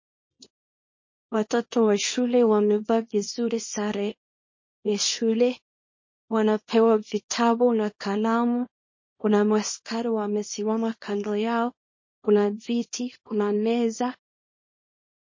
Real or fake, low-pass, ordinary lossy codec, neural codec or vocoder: fake; 7.2 kHz; MP3, 32 kbps; codec, 24 kHz, 0.9 kbps, WavTokenizer, small release